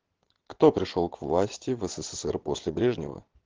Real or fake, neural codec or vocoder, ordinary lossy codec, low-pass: fake; vocoder, 22.05 kHz, 80 mel bands, WaveNeXt; Opus, 16 kbps; 7.2 kHz